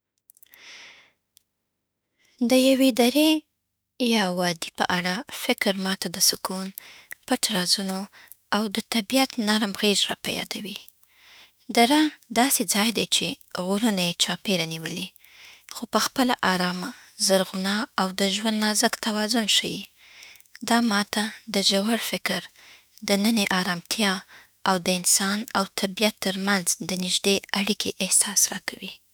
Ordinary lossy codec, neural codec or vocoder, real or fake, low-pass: none; autoencoder, 48 kHz, 32 numbers a frame, DAC-VAE, trained on Japanese speech; fake; none